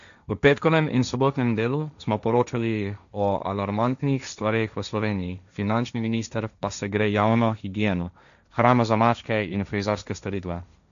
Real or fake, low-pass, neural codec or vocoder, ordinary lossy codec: fake; 7.2 kHz; codec, 16 kHz, 1.1 kbps, Voila-Tokenizer; none